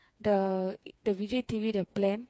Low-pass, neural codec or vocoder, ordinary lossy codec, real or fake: none; codec, 16 kHz, 4 kbps, FreqCodec, smaller model; none; fake